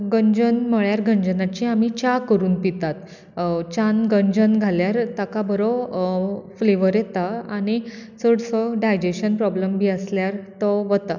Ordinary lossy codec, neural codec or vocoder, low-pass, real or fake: none; none; 7.2 kHz; real